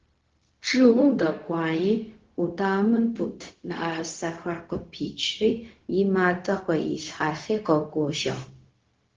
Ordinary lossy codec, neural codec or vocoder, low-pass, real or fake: Opus, 16 kbps; codec, 16 kHz, 0.4 kbps, LongCat-Audio-Codec; 7.2 kHz; fake